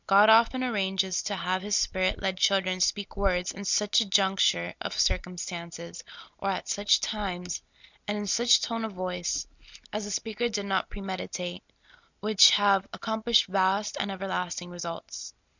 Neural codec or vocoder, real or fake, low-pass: none; real; 7.2 kHz